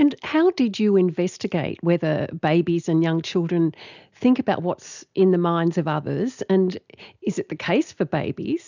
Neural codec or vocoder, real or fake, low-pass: none; real; 7.2 kHz